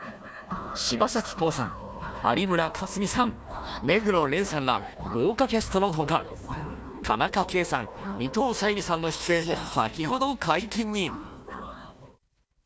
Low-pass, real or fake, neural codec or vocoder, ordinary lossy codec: none; fake; codec, 16 kHz, 1 kbps, FunCodec, trained on Chinese and English, 50 frames a second; none